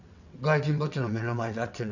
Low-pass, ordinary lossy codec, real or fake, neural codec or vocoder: 7.2 kHz; none; fake; vocoder, 22.05 kHz, 80 mel bands, WaveNeXt